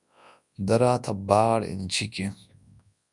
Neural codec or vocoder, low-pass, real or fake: codec, 24 kHz, 0.9 kbps, WavTokenizer, large speech release; 10.8 kHz; fake